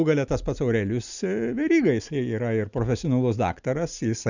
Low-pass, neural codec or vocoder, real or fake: 7.2 kHz; none; real